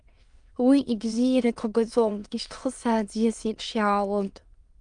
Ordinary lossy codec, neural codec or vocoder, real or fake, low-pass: Opus, 32 kbps; autoencoder, 22.05 kHz, a latent of 192 numbers a frame, VITS, trained on many speakers; fake; 9.9 kHz